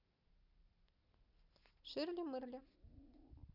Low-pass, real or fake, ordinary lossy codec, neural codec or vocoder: 5.4 kHz; real; none; none